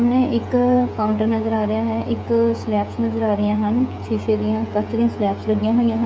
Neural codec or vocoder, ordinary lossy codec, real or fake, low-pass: codec, 16 kHz, 16 kbps, FreqCodec, smaller model; none; fake; none